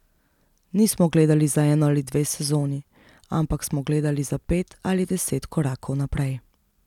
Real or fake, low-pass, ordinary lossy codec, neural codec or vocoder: real; 19.8 kHz; none; none